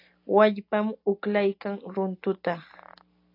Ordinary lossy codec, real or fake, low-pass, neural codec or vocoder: AAC, 32 kbps; real; 5.4 kHz; none